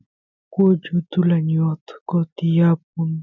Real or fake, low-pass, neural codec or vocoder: real; 7.2 kHz; none